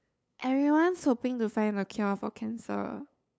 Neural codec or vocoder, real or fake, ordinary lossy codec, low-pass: codec, 16 kHz, 8 kbps, FunCodec, trained on LibriTTS, 25 frames a second; fake; none; none